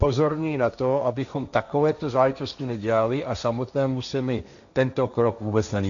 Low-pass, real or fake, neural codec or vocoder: 7.2 kHz; fake; codec, 16 kHz, 1.1 kbps, Voila-Tokenizer